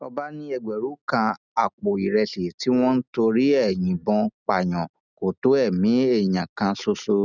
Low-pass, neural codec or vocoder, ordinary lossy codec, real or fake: 7.2 kHz; none; none; real